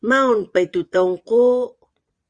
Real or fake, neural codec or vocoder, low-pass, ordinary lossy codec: fake; vocoder, 22.05 kHz, 80 mel bands, Vocos; 9.9 kHz; Opus, 64 kbps